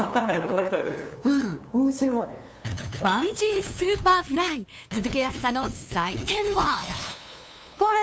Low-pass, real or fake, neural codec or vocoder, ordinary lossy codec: none; fake; codec, 16 kHz, 2 kbps, FunCodec, trained on LibriTTS, 25 frames a second; none